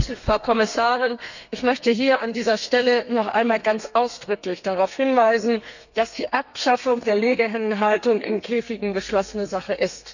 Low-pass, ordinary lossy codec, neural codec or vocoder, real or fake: 7.2 kHz; none; codec, 32 kHz, 1.9 kbps, SNAC; fake